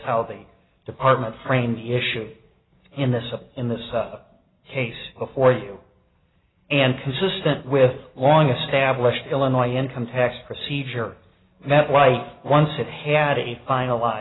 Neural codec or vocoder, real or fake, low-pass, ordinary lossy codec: none; real; 7.2 kHz; AAC, 16 kbps